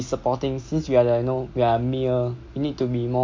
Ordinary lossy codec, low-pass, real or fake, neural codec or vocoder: MP3, 48 kbps; 7.2 kHz; real; none